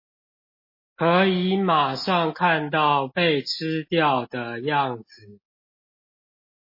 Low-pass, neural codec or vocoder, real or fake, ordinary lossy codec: 5.4 kHz; none; real; MP3, 24 kbps